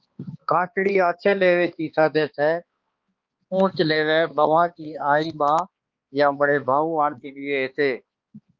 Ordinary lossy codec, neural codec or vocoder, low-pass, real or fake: Opus, 32 kbps; codec, 16 kHz, 2 kbps, X-Codec, HuBERT features, trained on balanced general audio; 7.2 kHz; fake